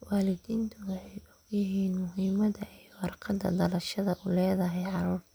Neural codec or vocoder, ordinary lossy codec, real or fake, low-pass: none; none; real; none